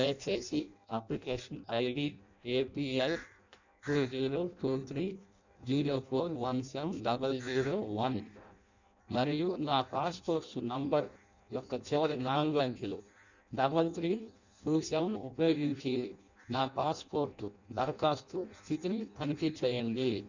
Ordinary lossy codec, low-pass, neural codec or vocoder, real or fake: none; 7.2 kHz; codec, 16 kHz in and 24 kHz out, 0.6 kbps, FireRedTTS-2 codec; fake